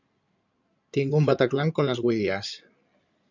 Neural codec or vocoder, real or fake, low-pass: codec, 16 kHz in and 24 kHz out, 2.2 kbps, FireRedTTS-2 codec; fake; 7.2 kHz